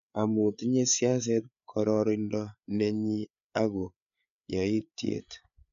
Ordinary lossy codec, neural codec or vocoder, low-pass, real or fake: MP3, 96 kbps; codec, 16 kHz, 8 kbps, FreqCodec, larger model; 7.2 kHz; fake